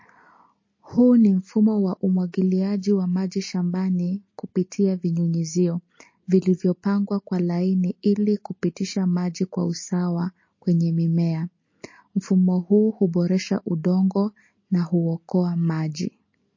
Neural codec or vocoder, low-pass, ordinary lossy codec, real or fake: none; 7.2 kHz; MP3, 32 kbps; real